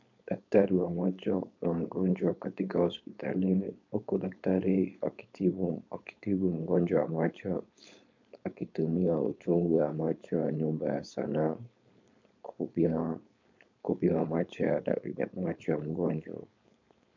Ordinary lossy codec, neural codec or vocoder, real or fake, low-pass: MP3, 64 kbps; codec, 16 kHz, 4.8 kbps, FACodec; fake; 7.2 kHz